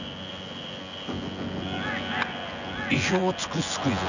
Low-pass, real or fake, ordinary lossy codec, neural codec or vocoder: 7.2 kHz; fake; none; vocoder, 24 kHz, 100 mel bands, Vocos